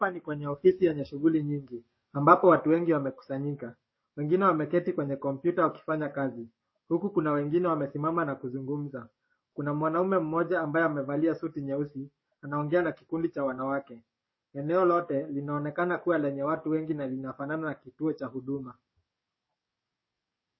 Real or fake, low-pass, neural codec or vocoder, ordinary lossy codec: fake; 7.2 kHz; codec, 44.1 kHz, 7.8 kbps, DAC; MP3, 24 kbps